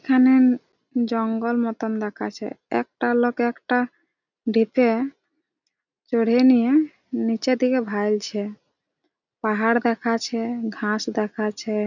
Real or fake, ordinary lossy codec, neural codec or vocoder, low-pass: real; none; none; 7.2 kHz